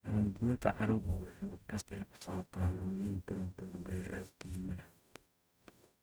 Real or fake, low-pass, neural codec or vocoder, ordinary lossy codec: fake; none; codec, 44.1 kHz, 0.9 kbps, DAC; none